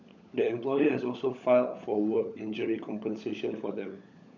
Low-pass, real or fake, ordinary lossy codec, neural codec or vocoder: 7.2 kHz; fake; none; codec, 16 kHz, 16 kbps, FunCodec, trained on LibriTTS, 50 frames a second